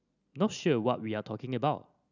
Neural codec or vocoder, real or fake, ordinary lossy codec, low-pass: none; real; none; 7.2 kHz